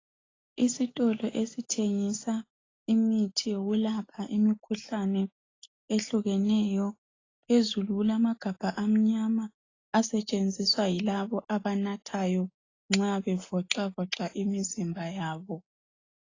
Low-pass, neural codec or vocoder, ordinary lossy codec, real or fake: 7.2 kHz; none; AAC, 32 kbps; real